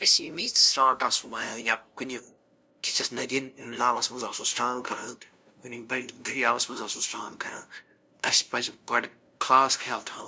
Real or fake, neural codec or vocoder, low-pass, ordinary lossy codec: fake; codec, 16 kHz, 0.5 kbps, FunCodec, trained on LibriTTS, 25 frames a second; none; none